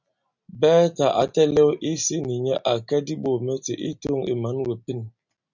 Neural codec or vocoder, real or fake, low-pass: none; real; 7.2 kHz